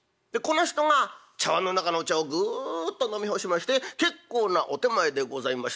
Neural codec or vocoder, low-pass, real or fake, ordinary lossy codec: none; none; real; none